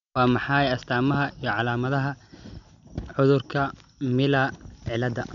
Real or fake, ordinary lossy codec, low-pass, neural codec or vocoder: real; none; 7.2 kHz; none